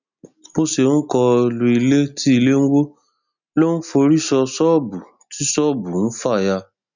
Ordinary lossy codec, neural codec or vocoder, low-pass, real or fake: none; none; 7.2 kHz; real